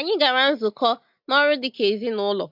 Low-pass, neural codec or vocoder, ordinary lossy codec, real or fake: 5.4 kHz; none; MP3, 48 kbps; real